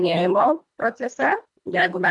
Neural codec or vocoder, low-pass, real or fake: codec, 24 kHz, 1.5 kbps, HILCodec; 10.8 kHz; fake